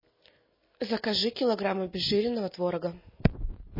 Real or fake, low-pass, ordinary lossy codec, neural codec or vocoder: real; 5.4 kHz; MP3, 24 kbps; none